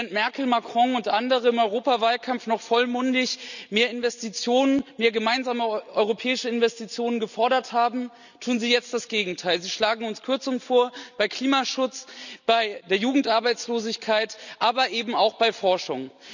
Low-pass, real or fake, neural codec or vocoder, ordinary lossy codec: 7.2 kHz; real; none; none